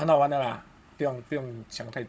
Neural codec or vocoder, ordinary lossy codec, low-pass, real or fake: codec, 16 kHz, 16 kbps, FunCodec, trained on Chinese and English, 50 frames a second; none; none; fake